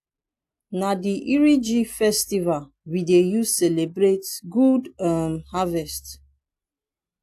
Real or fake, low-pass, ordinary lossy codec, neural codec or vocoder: real; 14.4 kHz; AAC, 64 kbps; none